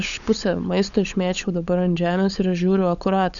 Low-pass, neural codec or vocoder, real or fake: 7.2 kHz; codec, 16 kHz, 16 kbps, FunCodec, trained on LibriTTS, 50 frames a second; fake